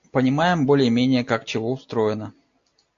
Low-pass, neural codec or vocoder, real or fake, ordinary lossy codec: 7.2 kHz; none; real; AAC, 48 kbps